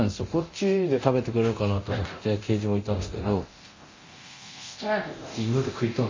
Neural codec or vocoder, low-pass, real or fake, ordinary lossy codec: codec, 24 kHz, 0.9 kbps, DualCodec; 7.2 kHz; fake; MP3, 32 kbps